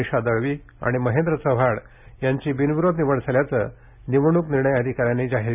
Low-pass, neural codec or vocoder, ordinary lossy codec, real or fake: 3.6 kHz; none; none; real